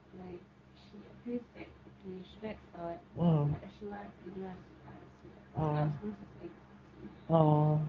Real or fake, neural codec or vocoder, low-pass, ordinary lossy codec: fake; codec, 24 kHz, 0.9 kbps, WavTokenizer, medium speech release version 2; 7.2 kHz; Opus, 32 kbps